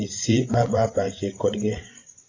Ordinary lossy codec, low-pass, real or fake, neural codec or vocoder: AAC, 32 kbps; 7.2 kHz; fake; codec, 16 kHz, 16 kbps, FreqCodec, larger model